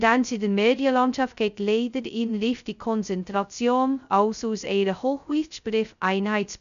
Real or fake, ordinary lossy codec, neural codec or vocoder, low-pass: fake; none; codec, 16 kHz, 0.2 kbps, FocalCodec; 7.2 kHz